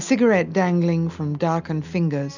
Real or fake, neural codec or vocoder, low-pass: real; none; 7.2 kHz